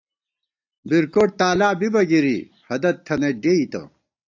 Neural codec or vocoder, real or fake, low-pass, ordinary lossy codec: none; real; 7.2 kHz; AAC, 48 kbps